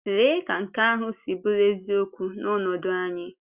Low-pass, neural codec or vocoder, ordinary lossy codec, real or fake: 3.6 kHz; none; Opus, 64 kbps; real